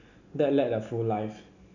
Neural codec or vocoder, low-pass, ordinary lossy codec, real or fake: none; 7.2 kHz; none; real